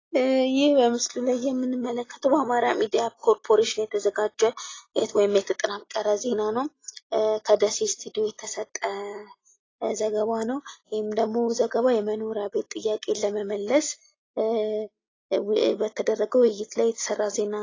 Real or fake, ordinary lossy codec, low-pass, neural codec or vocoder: real; AAC, 32 kbps; 7.2 kHz; none